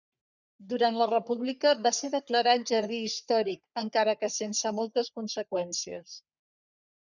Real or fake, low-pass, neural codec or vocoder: fake; 7.2 kHz; codec, 44.1 kHz, 3.4 kbps, Pupu-Codec